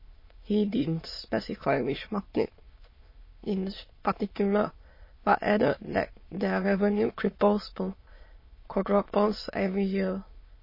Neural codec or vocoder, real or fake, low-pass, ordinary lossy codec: autoencoder, 22.05 kHz, a latent of 192 numbers a frame, VITS, trained on many speakers; fake; 5.4 kHz; MP3, 24 kbps